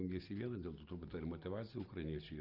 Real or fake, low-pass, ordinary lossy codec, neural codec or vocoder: real; 5.4 kHz; Opus, 32 kbps; none